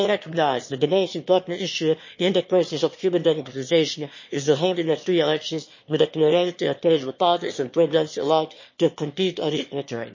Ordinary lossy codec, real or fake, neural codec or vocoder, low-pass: MP3, 32 kbps; fake; autoencoder, 22.05 kHz, a latent of 192 numbers a frame, VITS, trained on one speaker; 7.2 kHz